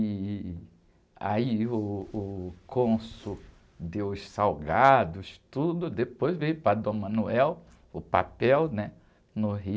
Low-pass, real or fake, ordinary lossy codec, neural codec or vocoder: none; real; none; none